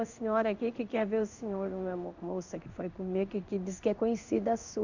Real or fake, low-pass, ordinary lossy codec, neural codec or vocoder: fake; 7.2 kHz; none; codec, 16 kHz in and 24 kHz out, 1 kbps, XY-Tokenizer